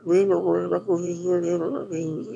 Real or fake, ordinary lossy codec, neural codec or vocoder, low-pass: fake; none; autoencoder, 22.05 kHz, a latent of 192 numbers a frame, VITS, trained on one speaker; none